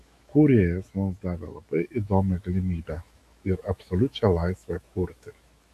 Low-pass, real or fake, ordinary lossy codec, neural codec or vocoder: 14.4 kHz; fake; AAC, 64 kbps; autoencoder, 48 kHz, 128 numbers a frame, DAC-VAE, trained on Japanese speech